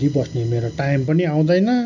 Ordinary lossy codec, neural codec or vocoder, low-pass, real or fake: none; none; 7.2 kHz; real